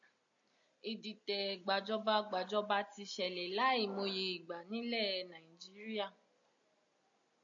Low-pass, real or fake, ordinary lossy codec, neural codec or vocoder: 7.2 kHz; real; AAC, 64 kbps; none